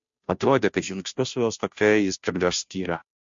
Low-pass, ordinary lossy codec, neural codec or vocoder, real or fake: 7.2 kHz; MP3, 48 kbps; codec, 16 kHz, 0.5 kbps, FunCodec, trained on Chinese and English, 25 frames a second; fake